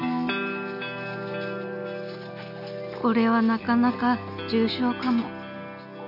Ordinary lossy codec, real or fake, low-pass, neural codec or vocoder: none; real; 5.4 kHz; none